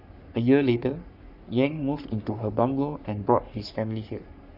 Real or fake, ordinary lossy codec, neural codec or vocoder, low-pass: fake; none; codec, 44.1 kHz, 3.4 kbps, Pupu-Codec; 5.4 kHz